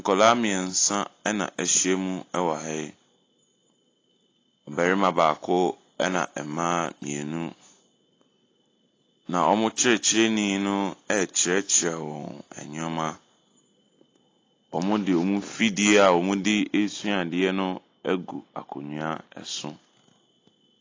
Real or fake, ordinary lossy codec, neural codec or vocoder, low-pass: real; AAC, 32 kbps; none; 7.2 kHz